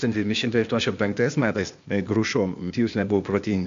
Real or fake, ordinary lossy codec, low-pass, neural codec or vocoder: fake; MP3, 48 kbps; 7.2 kHz; codec, 16 kHz, 0.8 kbps, ZipCodec